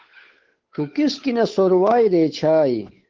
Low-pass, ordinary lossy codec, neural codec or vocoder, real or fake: 7.2 kHz; Opus, 16 kbps; codec, 16 kHz, 8 kbps, FunCodec, trained on Chinese and English, 25 frames a second; fake